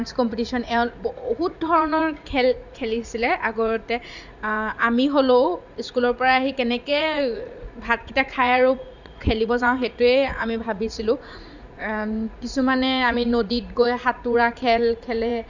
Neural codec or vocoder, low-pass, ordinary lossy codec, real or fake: vocoder, 44.1 kHz, 80 mel bands, Vocos; 7.2 kHz; none; fake